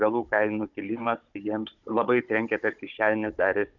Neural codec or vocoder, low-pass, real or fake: codec, 16 kHz, 16 kbps, FunCodec, trained on Chinese and English, 50 frames a second; 7.2 kHz; fake